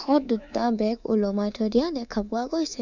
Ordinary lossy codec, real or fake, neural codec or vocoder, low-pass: none; fake; codec, 24 kHz, 3.1 kbps, DualCodec; 7.2 kHz